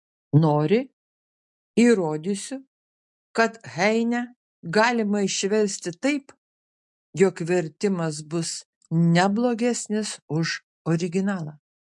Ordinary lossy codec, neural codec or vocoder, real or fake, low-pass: MP3, 64 kbps; none; real; 10.8 kHz